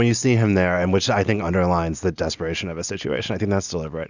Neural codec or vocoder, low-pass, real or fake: none; 7.2 kHz; real